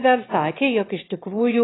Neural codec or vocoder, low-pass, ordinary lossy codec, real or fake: autoencoder, 22.05 kHz, a latent of 192 numbers a frame, VITS, trained on one speaker; 7.2 kHz; AAC, 16 kbps; fake